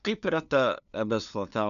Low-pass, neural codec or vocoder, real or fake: 7.2 kHz; codec, 16 kHz, 2 kbps, FunCodec, trained on LibriTTS, 25 frames a second; fake